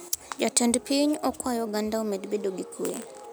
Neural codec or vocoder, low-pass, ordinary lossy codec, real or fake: none; none; none; real